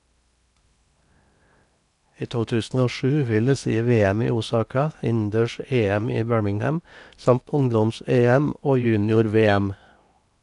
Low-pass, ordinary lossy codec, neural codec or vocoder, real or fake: 10.8 kHz; none; codec, 16 kHz in and 24 kHz out, 0.8 kbps, FocalCodec, streaming, 65536 codes; fake